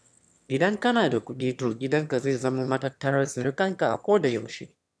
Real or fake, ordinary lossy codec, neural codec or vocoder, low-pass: fake; none; autoencoder, 22.05 kHz, a latent of 192 numbers a frame, VITS, trained on one speaker; none